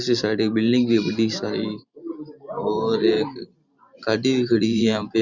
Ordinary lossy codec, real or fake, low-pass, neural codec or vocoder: Opus, 64 kbps; fake; 7.2 kHz; vocoder, 44.1 kHz, 128 mel bands every 512 samples, BigVGAN v2